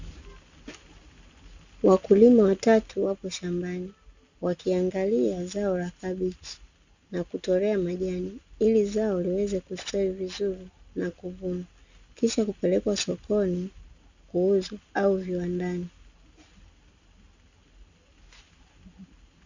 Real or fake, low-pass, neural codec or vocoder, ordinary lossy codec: real; 7.2 kHz; none; Opus, 64 kbps